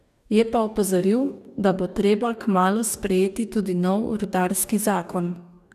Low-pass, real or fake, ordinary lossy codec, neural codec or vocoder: 14.4 kHz; fake; none; codec, 44.1 kHz, 2.6 kbps, DAC